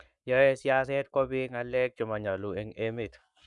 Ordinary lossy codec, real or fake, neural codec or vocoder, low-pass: none; fake; codec, 44.1 kHz, 7.8 kbps, Pupu-Codec; 10.8 kHz